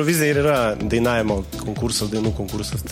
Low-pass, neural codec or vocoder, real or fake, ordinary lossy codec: 19.8 kHz; none; real; MP3, 64 kbps